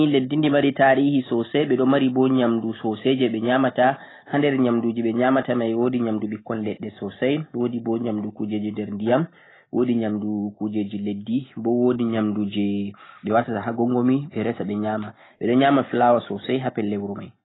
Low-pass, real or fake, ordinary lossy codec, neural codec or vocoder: 7.2 kHz; real; AAC, 16 kbps; none